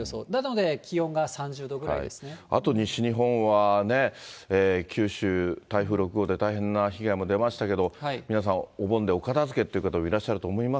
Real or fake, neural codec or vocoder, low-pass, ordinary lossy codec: real; none; none; none